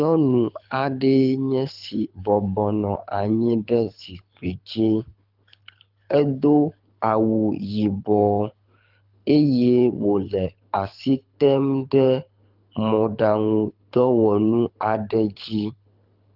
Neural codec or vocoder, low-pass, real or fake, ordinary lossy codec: codec, 24 kHz, 6 kbps, HILCodec; 5.4 kHz; fake; Opus, 24 kbps